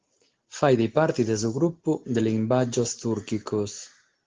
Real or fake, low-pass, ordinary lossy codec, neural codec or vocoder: real; 7.2 kHz; Opus, 16 kbps; none